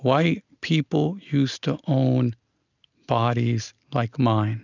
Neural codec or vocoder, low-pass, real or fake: none; 7.2 kHz; real